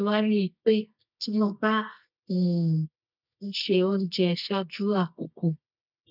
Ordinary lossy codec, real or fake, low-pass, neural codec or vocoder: none; fake; 5.4 kHz; codec, 24 kHz, 0.9 kbps, WavTokenizer, medium music audio release